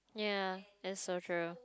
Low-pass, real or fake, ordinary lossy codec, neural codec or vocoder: none; real; none; none